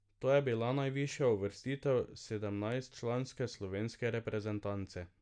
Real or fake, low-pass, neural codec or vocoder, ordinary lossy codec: real; 9.9 kHz; none; none